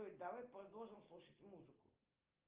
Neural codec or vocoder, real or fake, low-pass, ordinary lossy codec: none; real; 3.6 kHz; Opus, 24 kbps